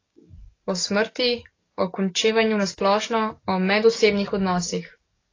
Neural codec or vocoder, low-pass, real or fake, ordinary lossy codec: codec, 44.1 kHz, 7.8 kbps, DAC; 7.2 kHz; fake; AAC, 32 kbps